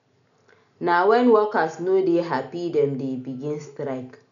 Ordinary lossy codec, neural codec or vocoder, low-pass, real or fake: none; none; 7.2 kHz; real